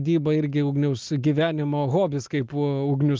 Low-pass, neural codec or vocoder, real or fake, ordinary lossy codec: 7.2 kHz; none; real; Opus, 32 kbps